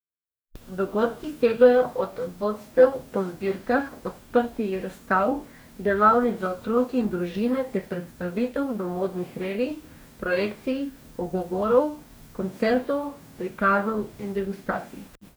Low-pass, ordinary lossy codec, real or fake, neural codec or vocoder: none; none; fake; codec, 44.1 kHz, 2.6 kbps, DAC